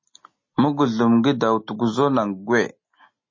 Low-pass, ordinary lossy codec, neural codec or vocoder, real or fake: 7.2 kHz; MP3, 32 kbps; none; real